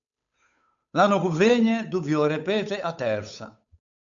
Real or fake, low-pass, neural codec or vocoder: fake; 7.2 kHz; codec, 16 kHz, 8 kbps, FunCodec, trained on Chinese and English, 25 frames a second